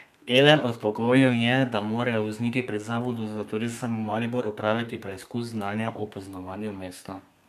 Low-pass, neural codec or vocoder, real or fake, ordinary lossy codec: 14.4 kHz; codec, 32 kHz, 1.9 kbps, SNAC; fake; none